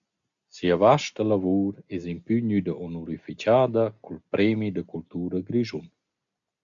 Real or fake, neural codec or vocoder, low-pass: real; none; 7.2 kHz